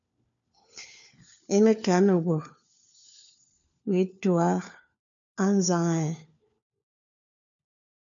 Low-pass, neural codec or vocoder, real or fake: 7.2 kHz; codec, 16 kHz, 4 kbps, FunCodec, trained on LibriTTS, 50 frames a second; fake